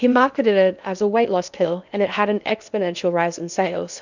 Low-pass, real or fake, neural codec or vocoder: 7.2 kHz; fake; codec, 16 kHz in and 24 kHz out, 0.8 kbps, FocalCodec, streaming, 65536 codes